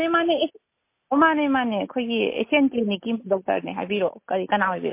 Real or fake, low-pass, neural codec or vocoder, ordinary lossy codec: real; 3.6 kHz; none; MP3, 24 kbps